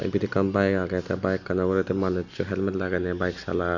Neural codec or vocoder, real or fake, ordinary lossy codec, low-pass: none; real; none; 7.2 kHz